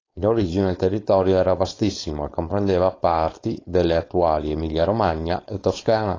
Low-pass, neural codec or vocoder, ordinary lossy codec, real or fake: 7.2 kHz; codec, 16 kHz, 4.8 kbps, FACodec; AAC, 32 kbps; fake